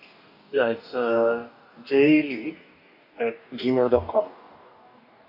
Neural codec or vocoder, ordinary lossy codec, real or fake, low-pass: codec, 44.1 kHz, 2.6 kbps, DAC; none; fake; 5.4 kHz